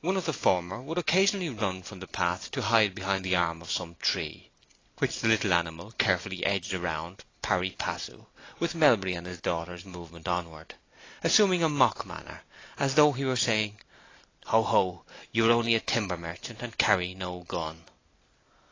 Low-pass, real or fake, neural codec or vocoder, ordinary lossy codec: 7.2 kHz; real; none; AAC, 32 kbps